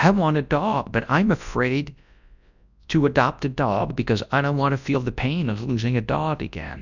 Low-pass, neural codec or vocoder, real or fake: 7.2 kHz; codec, 24 kHz, 0.9 kbps, WavTokenizer, large speech release; fake